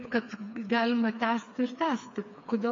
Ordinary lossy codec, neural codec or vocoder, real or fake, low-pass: MP3, 48 kbps; codec, 16 kHz, 4 kbps, FreqCodec, smaller model; fake; 7.2 kHz